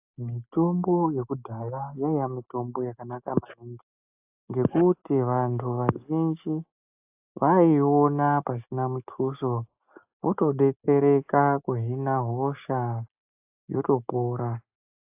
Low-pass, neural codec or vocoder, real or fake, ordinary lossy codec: 3.6 kHz; none; real; AAC, 32 kbps